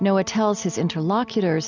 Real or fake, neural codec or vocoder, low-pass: real; none; 7.2 kHz